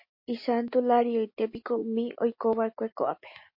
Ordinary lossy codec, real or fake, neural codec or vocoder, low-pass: MP3, 32 kbps; real; none; 5.4 kHz